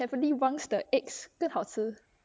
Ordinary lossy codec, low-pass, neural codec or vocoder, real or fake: none; none; none; real